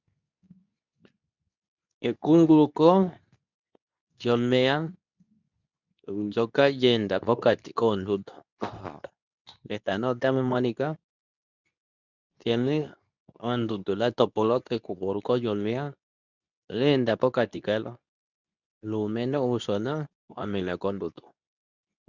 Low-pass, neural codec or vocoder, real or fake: 7.2 kHz; codec, 24 kHz, 0.9 kbps, WavTokenizer, medium speech release version 2; fake